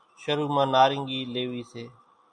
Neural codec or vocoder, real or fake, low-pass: none; real; 9.9 kHz